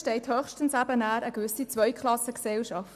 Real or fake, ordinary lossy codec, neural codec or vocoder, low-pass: real; none; none; 14.4 kHz